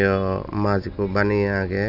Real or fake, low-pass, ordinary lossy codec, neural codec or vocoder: real; 5.4 kHz; none; none